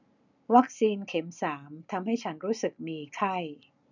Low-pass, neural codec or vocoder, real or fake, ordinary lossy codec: 7.2 kHz; none; real; none